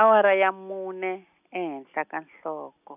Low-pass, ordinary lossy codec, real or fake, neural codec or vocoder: 3.6 kHz; none; real; none